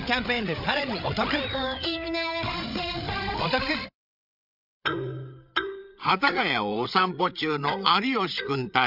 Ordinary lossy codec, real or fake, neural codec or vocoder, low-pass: none; fake; codec, 16 kHz, 8 kbps, FreqCodec, larger model; 5.4 kHz